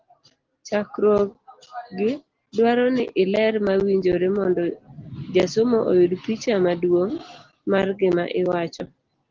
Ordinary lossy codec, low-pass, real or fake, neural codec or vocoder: Opus, 16 kbps; 7.2 kHz; real; none